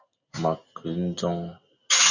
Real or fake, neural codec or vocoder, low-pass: real; none; 7.2 kHz